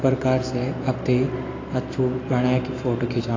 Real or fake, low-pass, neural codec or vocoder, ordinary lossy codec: real; 7.2 kHz; none; AAC, 32 kbps